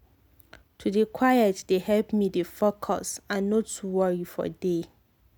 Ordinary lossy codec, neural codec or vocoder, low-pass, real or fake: none; none; none; real